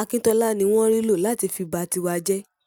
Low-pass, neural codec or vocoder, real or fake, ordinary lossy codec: none; none; real; none